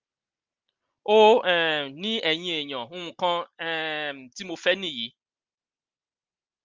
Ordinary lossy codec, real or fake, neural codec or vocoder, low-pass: Opus, 32 kbps; real; none; 7.2 kHz